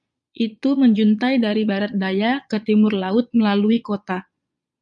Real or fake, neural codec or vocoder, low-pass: fake; vocoder, 22.05 kHz, 80 mel bands, Vocos; 9.9 kHz